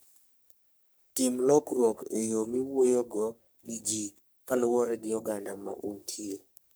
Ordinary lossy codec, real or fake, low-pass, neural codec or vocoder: none; fake; none; codec, 44.1 kHz, 3.4 kbps, Pupu-Codec